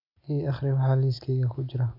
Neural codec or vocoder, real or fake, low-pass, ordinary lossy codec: none; real; 5.4 kHz; none